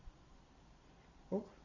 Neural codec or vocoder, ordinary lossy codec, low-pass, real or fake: vocoder, 44.1 kHz, 128 mel bands every 256 samples, BigVGAN v2; AAC, 48 kbps; 7.2 kHz; fake